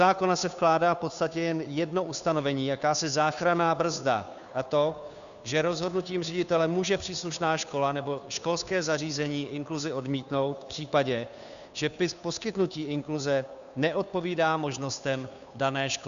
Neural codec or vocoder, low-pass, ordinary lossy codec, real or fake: codec, 16 kHz, 2 kbps, FunCodec, trained on Chinese and English, 25 frames a second; 7.2 kHz; MP3, 96 kbps; fake